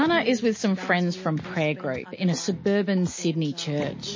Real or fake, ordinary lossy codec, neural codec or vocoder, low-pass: real; MP3, 32 kbps; none; 7.2 kHz